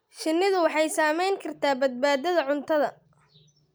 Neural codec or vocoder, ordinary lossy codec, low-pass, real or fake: none; none; none; real